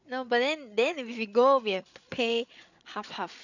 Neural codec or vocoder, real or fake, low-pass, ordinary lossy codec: codec, 16 kHz, 8 kbps, FreqCodec, larger model; fake; 7.2 kHz; none